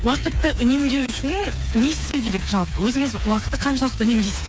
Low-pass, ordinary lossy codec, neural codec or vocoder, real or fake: none; none; codec, 16 kHz, 4 kbps, FreqCodec, smaller model; fake